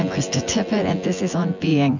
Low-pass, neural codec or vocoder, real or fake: 7.2 kHz; vocoder, 24 kHz, 100 mel bands, Vocos; fake